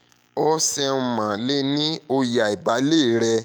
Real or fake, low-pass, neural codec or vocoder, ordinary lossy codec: fake; none; autoencoder, 48 kHz, 128 numbers a frame, DAC-VAE, trained on Japanese speech; none